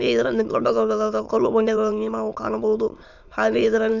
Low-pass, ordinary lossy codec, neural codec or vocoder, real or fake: 7.2 kHz; none; autoencoder, 22.05 kHz, a latent of 192 numbers a frame, VITS, trained on many speakers; fake